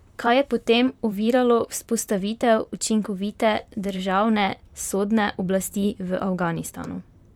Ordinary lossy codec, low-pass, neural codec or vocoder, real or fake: none; 19.8 kHz; vocoder, 44.1 kHz, 128 mel bands, Pupu-Vocoder; fake